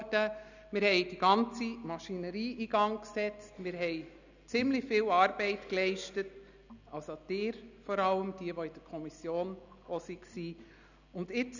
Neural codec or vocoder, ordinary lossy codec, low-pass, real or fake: none; none; 7.2 kHz; real